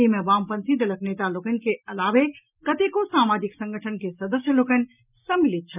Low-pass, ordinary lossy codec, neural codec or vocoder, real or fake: 3.6 kHz; none; none; real